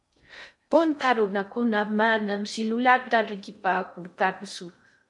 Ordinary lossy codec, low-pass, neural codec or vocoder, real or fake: MP3, 64 kbps; 10.8 kHz; codec, 16 kHz in and 24 kHz out, 0.6 kbps, FocalCodec, streaming, 2048 codes; fake